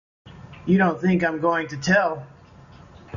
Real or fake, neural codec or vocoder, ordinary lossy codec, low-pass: real; none; MP3, 96 kbps; 7.2 kHz